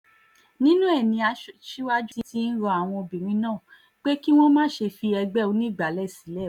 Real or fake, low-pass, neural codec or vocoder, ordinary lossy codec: real; 19.8 kHz; none; none